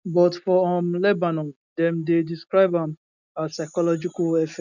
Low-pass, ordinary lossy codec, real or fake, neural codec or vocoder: 7.2 kHz; none; real; none